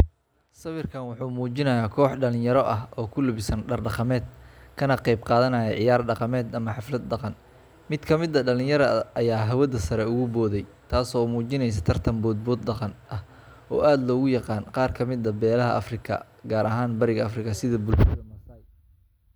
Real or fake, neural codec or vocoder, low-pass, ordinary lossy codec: real; none; none; none